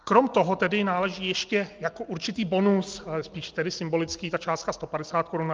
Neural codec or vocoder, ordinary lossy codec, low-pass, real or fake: none; Opus, 24 kbps; 7.2 kHz; real